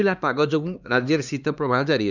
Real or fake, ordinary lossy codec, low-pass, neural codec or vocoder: fake; none; 7.2 kHz; codec, 16 kHz, 2 kbps, X-Codec, HuBERT features, trained on LibriSpeech